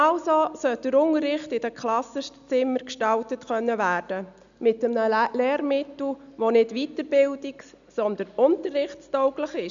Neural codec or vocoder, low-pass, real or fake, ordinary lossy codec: none; 7.2 kHz; real; none